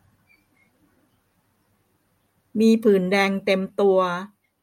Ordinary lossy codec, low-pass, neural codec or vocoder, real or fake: MP3, 64 kbps; 19.8 kHz; none; real